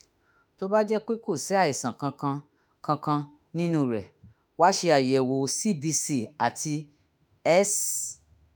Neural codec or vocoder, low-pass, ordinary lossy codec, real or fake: autoencoder, 48 kHz, 32 numbers a frame, DAC-VAE, trained on Japanese speech; none; none; fake